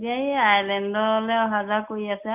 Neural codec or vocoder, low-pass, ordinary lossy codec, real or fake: none; 3.6 kHz; none; real